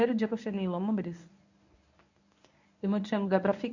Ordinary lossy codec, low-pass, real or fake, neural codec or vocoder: none; 7.2 kHz; fake; codec, 24 kHz, 0.9 kbps, WavTokenizer, medium speech release version 1